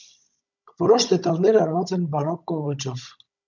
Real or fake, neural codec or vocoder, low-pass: fake; codec, 16 kHz, 16 kbps, FunCodec, trained on Chinese and English, 50 frames a second; 7.2 kHz